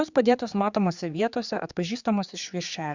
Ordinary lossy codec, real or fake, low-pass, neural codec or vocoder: Opus, 64 kbps; fake; 7.2 kHz; codec, 16 kHz, 4 kbps, X-Codec, HuBERT features, trained on general audio